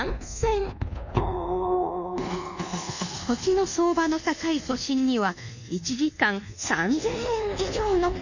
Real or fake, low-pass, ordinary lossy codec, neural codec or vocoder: fake; 7.2 kHz; none; codec, 24 kHz, 1.2 kbps, DualCodec